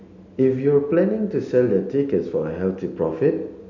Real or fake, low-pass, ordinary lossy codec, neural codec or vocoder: real; 7.2 kHz; none; none